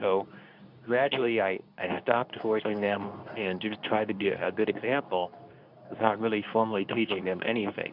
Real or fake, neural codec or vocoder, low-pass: fake; codec, 24 kHz, 0.9 kbps, WavTokenizer, medium speech release version 2; 5.4 kHz